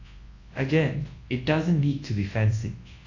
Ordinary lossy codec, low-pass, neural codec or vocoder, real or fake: AAC, 32 kbps; 7.2 kHz; codec, 24 kHz, 0.9 kbps, WavTokenizer, large speech release; fake